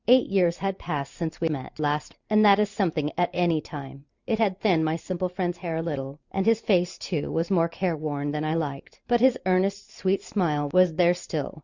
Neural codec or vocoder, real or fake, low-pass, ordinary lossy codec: vocoder, 44.1 kHz, 128 mel bands every 512 samples, BigVGAN v2; fake; 7.2 kHz; Opus, 64 kbps